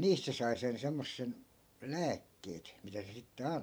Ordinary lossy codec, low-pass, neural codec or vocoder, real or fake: none; none; vocoder, 44.1 kHz, 128 mel bands every 512 samples, BigVGAN v2; fake